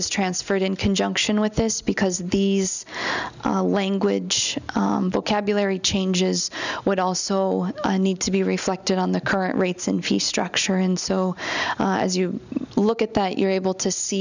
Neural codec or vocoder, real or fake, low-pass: none; real; 7.2 kHz